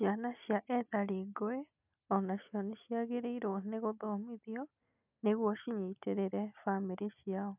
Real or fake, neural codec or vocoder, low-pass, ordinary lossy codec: real; none; 3.6 kHz; none